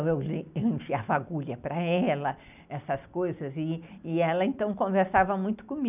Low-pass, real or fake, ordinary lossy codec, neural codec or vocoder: 3.6 kHz; real; none; none